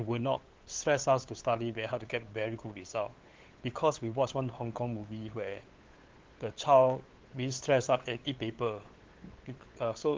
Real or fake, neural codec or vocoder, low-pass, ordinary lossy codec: fake; codec, 16 kHz, 8 kbps, FunCodec, trained on LibriTTS, 25 frames a second; 7.2 kHz; Opus, 16 kbps